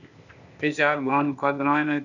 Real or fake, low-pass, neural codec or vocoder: fake; 7.2 kHz; codec, 16 kHz, 0.8 kbps, ZipCodec